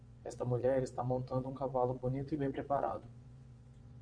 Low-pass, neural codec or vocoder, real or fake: 9.9 kHz; vocoder, 22.05 kHz, 80 mel bands, Vocos; fake